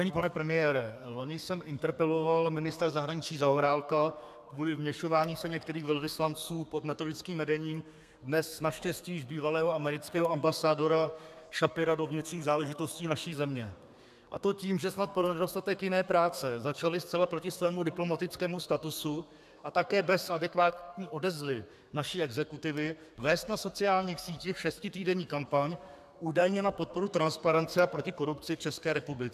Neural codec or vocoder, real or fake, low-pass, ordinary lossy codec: codec, 32 kHz, 1.9 kbps, SNAC; fake; 14.4 kHz; AAC, 96 kbps